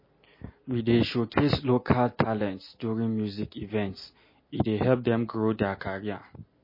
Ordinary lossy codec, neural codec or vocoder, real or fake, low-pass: MP3, 24 kbps; none; real; 5.4 kHz